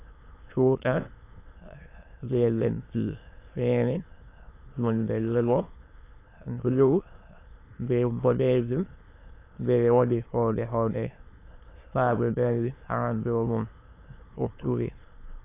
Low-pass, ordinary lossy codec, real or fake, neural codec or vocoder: 3.6 kHz; AAC, 24 kbps; fake; autoencoder, 22.05 kHz, a latent of 192 numbers a frame, VITS, trained on many speakers